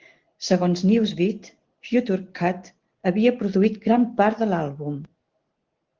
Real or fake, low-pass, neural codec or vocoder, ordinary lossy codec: real; 7.2 kHz; none; Opus, 16 kbps